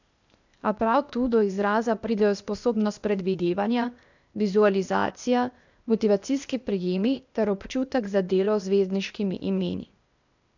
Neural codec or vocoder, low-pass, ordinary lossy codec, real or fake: codec, 16 kHz, 0.8 kbps, ZipCodec; 7.2 kHz; none; fake